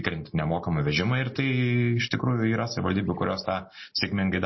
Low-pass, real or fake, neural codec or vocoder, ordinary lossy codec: 7.2 kHz; real; none; MP3, 24 kbps